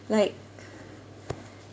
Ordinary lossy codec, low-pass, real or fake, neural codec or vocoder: none; none; real; none